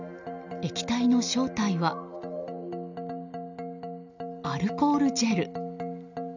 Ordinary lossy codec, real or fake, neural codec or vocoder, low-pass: none; real; none; 7.2 kHz